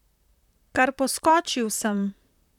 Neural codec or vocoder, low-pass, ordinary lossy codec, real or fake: vocoder, 44.1 kHz, 128 mel bands, Pupu-Vocoder; 19.8 kHz; none; fake